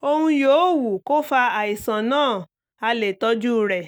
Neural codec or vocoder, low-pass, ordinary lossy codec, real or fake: none; none; none; real